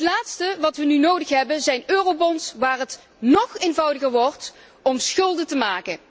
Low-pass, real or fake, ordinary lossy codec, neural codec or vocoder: none; real; none; none